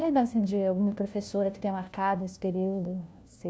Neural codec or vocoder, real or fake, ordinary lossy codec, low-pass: codec, 16 kHz, 1 kbps, FunCodec, trained on LibriTTS, 50 frames a second; fake; none; none